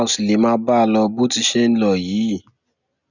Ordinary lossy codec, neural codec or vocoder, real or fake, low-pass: none; none; real; 7.2 kHz